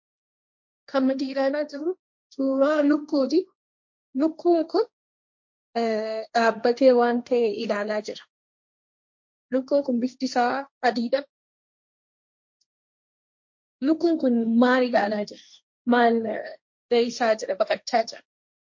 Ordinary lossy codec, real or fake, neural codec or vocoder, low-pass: MP3, 48 kbps; fake; codec, 16 kHz, 1.1 kbps, Voila-Tokenizer; 7.2 kHz